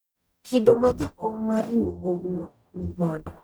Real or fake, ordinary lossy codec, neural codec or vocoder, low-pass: fake; none; codec, 44.1 kHz, 0.9 kbps, DAC; none